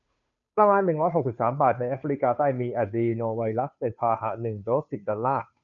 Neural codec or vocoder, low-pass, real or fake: codec, 16 kHz, 2 kbps, FunCodec, trained on Chinese and English, 25 frames a second; 7.2 kHz; fake